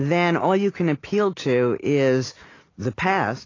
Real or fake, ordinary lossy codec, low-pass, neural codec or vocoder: real; AAC, 32 kbps; 7.2 kHz; none